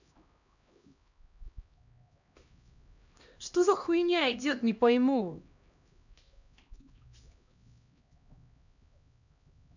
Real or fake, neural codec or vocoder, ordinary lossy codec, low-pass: fake; codec, 16 kHz, 1 kbps, X-Codec, HuBERT features, trained on LibriSpeech; none; 7.2 kHz